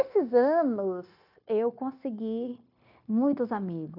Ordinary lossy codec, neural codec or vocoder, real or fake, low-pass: none; codec, 16 kHz, 0.9 kbps, LongCat-Audio-Codec; fake; 5.4 kHz